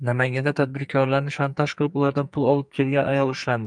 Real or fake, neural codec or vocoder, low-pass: fake; codec, 44.1 kHz, 2.6 kbps, SNAC; 9.9 kHz